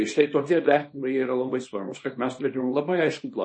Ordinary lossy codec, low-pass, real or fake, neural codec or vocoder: MP3, 32 kbps; 9.9 kHz; fake; codec, 24 kHz, 0.9 kbps, WavTokenizer, small release